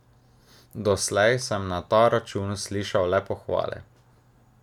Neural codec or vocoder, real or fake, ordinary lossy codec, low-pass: none; real; none; 19.8 kHz